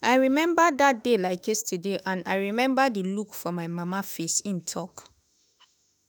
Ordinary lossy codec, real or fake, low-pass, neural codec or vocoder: none; fake; none; autoencoder, 48 kHz, 32 numbers a frame, DAC-VAE, trained on Japanese speech